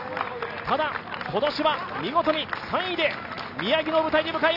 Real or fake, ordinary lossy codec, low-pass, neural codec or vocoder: real; AAC, 48 kbps; 5.4 kHz; none